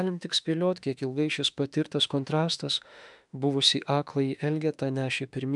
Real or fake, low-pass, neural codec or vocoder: fake; 10.8 kHz; autoencoder, 48 kHz, 32 numbers a frame, DAC-VAE, trained on Japanese speech